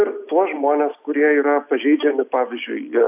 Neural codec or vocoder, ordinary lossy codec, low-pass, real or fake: none; AAC, 32 kbps; 3.6 kHz; real